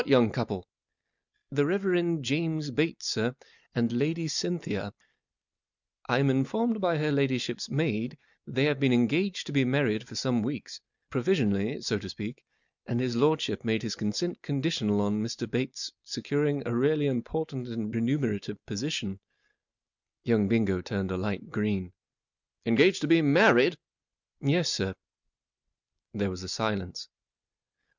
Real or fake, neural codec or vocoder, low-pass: real; none; 7.2 kHz